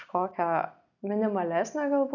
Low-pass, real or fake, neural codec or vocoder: 7.2 kHz; real; none